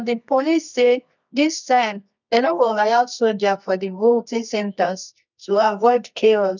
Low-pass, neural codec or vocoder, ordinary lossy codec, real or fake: 7.2 kHz; codec, 24 kHz, 0.9 kbps, WavTokenizer, medium music audio release; none; fake